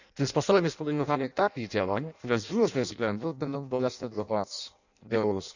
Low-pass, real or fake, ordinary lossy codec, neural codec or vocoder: 7.2 kHz; fake; none; codec, 16 kHz in and 24 kHz out, 0.6 kbps, FireRedTTS-2 codec